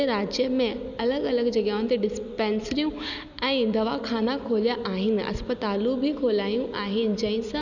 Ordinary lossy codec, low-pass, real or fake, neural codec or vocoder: none; 7.2 kHz; real; none